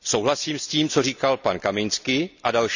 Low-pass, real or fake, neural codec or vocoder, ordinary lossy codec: 7.2 kHz; real; none; none